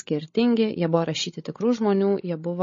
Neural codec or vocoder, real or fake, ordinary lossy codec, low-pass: codec, 16 kHz, 16 kbps, FreqCodec, larger model; fake; MP3, 32 kbps; 7.2 kHz